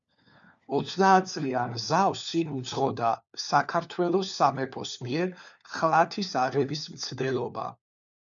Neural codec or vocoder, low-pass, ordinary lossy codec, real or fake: codec, 16 kHz, 4 kbps, FunCodec, trained on LibriTTS, 50 frames a second; 7.2 kHz; MP3, 96 kbps; fake